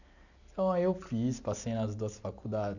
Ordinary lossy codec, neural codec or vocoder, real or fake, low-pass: none; none; real; 7.2 kHz